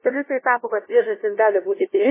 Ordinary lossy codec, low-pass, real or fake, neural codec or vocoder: MP3, 16 kbps; 3.6 kHz; fake; codec, 16 kHz, 0.5 kbps, FunCodec, trained on LibriTTS, 25 frames a second